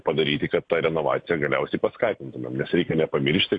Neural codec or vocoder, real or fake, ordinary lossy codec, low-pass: none; real; AAC, 48 kbps; 9.9 kHz